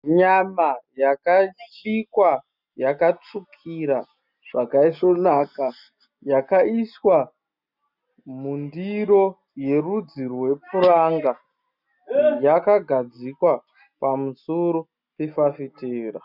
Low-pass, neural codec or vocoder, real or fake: 5.4 kHz; none; real